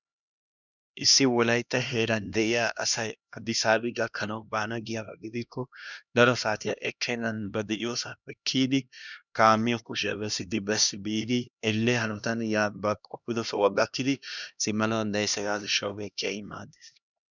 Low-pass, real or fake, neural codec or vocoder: 7.2 kHz; fake; codec, 16 kHz, 1 kbps, X-Codec, HuBERT features, trained on LibriSpeech